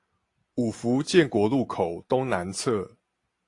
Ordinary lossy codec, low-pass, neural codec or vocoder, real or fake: AAC, 48 kbps; 10.8 kHz; none; real